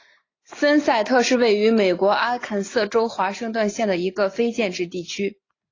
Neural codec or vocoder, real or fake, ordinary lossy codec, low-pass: none; real; AAC, 32 kbps; 7.2 kHz